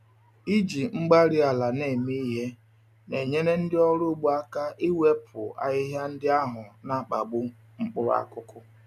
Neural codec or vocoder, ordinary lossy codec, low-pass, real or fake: none; none; 14.4 kHz; real